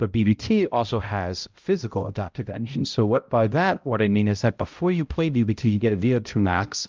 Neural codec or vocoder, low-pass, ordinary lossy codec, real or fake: codec, 16 kHz, 0.5 kbps, X-Codec, HuBERT features, trained on balanced general audio; 7.2 kHz; Opus, 24 kbps; fake